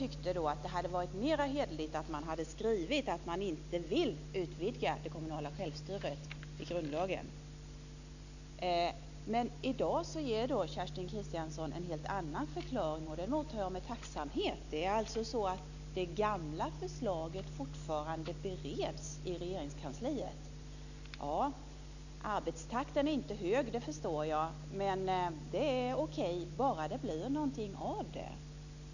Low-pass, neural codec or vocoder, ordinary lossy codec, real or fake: 7.2 kHz; none; none; real